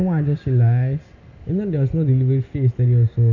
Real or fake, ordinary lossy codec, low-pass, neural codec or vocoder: real; none; 7.2 kHz; none